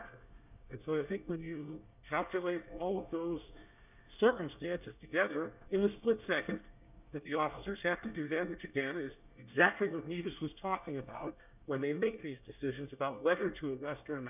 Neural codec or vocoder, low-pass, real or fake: codec, 24 kHz, 1 kbps, SNAC; 3.6 kHz; fake